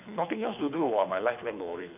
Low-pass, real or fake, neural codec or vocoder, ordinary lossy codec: 3.6 kHz; fake; codec, 24 kHz, 6 kbps, HILCodec; none